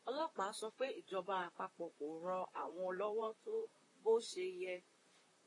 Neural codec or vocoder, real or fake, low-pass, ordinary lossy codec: vocoder, 44.1 kHz, 128 mel bands, Pupu-Vocoder; fake; 10.8 kHz; AAC, 32 kbps